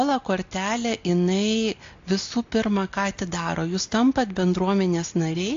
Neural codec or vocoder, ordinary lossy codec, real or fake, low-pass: none; AAC, 48 kbps; real; 7.2 kHz